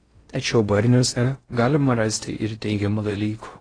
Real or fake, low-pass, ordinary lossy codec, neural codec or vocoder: fake; 9.9 kHz; AAC, 32 kbps; codec, 16 kHz in and 24 kHz out, 0.6 kbps, FocalCodec, streaming, 4096 codes